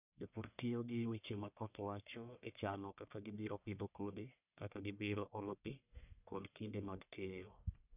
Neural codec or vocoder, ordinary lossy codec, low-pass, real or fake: codec, 44.1 kHz, 1.7 kbps, Pupu-Codec; none; 3.6 kHz; fake